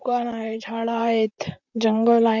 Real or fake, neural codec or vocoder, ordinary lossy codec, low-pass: fake; vocoder, 44.1 kHz, 128 mel bands, Pupu-Vocoder; Opus, 64 kbps; 7.2 kHz